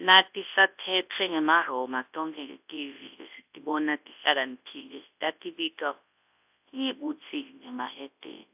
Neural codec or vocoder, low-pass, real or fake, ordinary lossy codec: codec, 24 kHz, 0.9 kbps, WavTokenizer, large speech release; 3.6 kHz; fake; AAC, 32 kbps